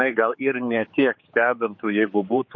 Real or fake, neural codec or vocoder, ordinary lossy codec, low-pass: fake; codec, 16 kHz, 4 kbps, X-Codec, HuBERT features, trained on general audio; MP3, 32 kbps; 7.2 kHz